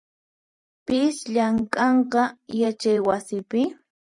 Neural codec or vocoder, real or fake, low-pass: vocoder, 44.1 kHz, 128 mel bands every 512 samples, BigVGAN v2; fake; 10.8 kHz